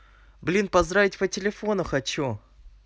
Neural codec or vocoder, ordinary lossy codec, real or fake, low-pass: none; none; real; none